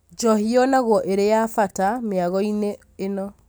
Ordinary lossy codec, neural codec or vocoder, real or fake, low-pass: none; none; real; none